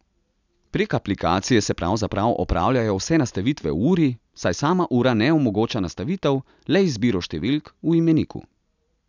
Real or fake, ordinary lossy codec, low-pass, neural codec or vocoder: real; none; 7.2 kHz; none